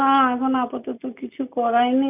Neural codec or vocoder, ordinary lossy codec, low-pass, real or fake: none; none; 3.6 kHz; real